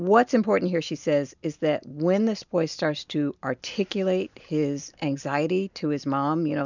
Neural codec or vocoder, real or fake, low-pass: none; real; 7.2 kHz